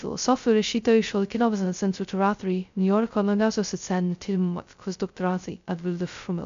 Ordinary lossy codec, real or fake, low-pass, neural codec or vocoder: MP3, 64 kbps; fake; 7.2 kHz; codec, 16 kHz, 0.2 kbps, FocalCodec